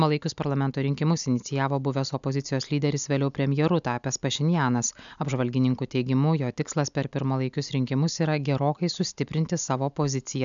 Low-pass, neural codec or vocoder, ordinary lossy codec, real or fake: 7.2 kHz; none; MP3, 96 kbps; real